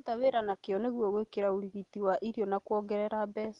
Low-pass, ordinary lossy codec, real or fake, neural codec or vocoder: 10.8 kHz; Opus, 32 kbps; real; none